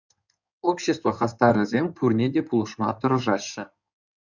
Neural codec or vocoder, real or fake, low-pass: codec, 16 kHz in and 24 kHz out, 2.2 kbps, FireRedTTS-2 codec; fake; 7.2 kHz